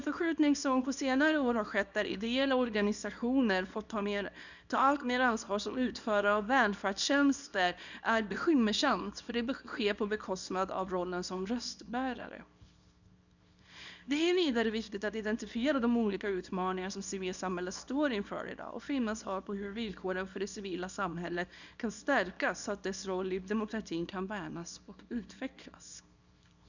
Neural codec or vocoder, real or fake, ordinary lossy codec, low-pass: codec, 24 kHz, 0.9 kbps, WavTokenizer, small release; fake; none; 7.2 kHz